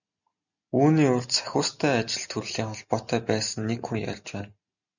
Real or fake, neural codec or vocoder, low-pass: real; none; 7.2 kHz